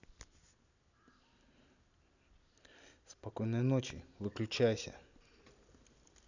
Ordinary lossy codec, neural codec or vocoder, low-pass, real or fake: none; none; 7.2 kHz; real